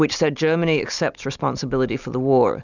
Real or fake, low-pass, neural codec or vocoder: real; 7.2 kHz; none